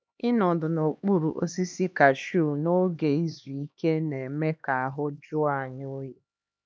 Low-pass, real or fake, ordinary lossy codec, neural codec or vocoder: none; fake; none; codec, 16 kHz, 2 kbps, X-Codec, HuBERT features, trained on LibriSpeech